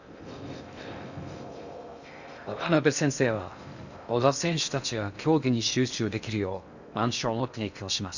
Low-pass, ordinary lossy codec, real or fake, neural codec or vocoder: 7.2 kHz; none; fake; codec, 16 kHz in and 24 kHz out, 0.6 kbps, FocalCodec, streaming, 2048 codes